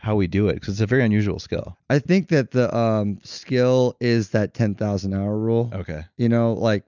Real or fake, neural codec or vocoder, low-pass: real; none; 7.2 kHz